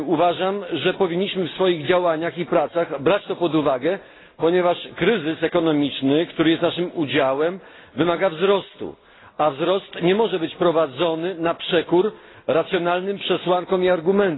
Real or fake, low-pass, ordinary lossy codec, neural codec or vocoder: real; 7.2 kHz; AAC, 16 kbps; none